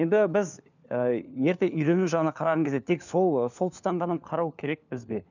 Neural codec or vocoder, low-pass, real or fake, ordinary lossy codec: codec, 16 kHz, 4 kbps, FunCodec, trained on LibriTTS, 50 frames a second; 7.2 kHz; fake; none